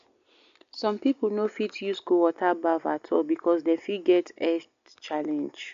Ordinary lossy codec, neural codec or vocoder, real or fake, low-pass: AAC, 64 kbps; none; real; 7.2 kHz